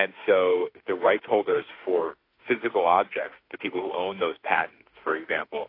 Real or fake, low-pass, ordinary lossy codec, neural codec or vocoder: fake; 5.4 kHz; AAC, 32 kbps; autoencoder, 48 kHz, 32 numbers a frame, DAC-VAE, trained on Japanese speech